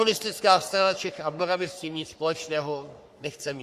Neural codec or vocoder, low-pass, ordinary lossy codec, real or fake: codec, 44.1 kHz, 3.4 kbps, Pupu-Codec; 14.4 kHz; Opus, 64 kbps; fake